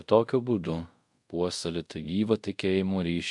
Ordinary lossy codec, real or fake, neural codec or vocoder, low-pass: MP3, 64 kbps; fake; codec, 24 kHz, 0.5 kbps, DualCodec; 10.8 kHz